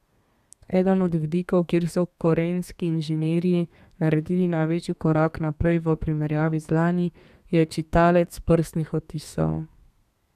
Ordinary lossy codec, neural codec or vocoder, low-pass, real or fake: none; codec, 32 kHz, 1.9 kbps, SNAC; 14.4 kHz; fake